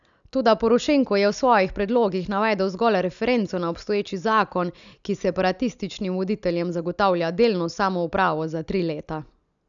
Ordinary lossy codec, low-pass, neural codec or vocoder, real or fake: none; 7.2 kHz; none; real